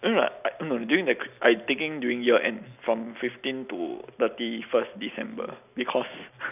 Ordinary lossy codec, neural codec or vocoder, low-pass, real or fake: none; none; 3.6 kHz; real